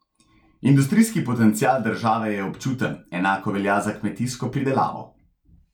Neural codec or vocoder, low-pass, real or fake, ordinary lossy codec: none; 19.8 kHz; real; none